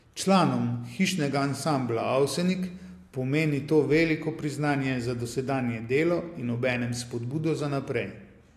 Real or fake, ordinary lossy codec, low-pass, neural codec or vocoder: real; AAC, 64 kbps; 14.4 kHz; none